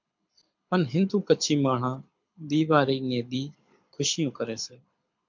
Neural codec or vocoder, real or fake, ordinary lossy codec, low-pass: codec, 24 kHz, 6 kbps, HILCodec; fake; MP3, 64 kbps; 7.2 kHz